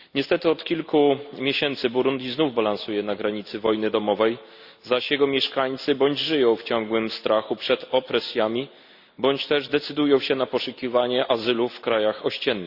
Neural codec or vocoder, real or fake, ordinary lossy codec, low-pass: none; real; Opus, 64 kbps; 5.4 kHz